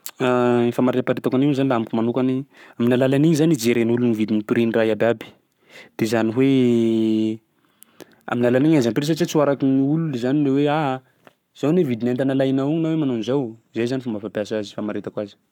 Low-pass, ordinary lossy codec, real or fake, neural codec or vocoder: 19.8 kHz; none; fake; codec, 44.1 kHz, 7.8 kbps, Pupu-Codec